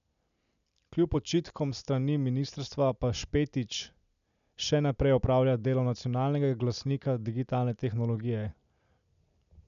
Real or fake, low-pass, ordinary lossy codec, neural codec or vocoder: real; 7.2 kHz; none; none